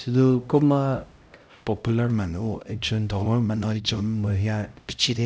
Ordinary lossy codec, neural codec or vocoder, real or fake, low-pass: none; codec, 16 kHz, 0.5 kbps, X-Codec, HuBERT features, trained on LibriSpeech; fake; none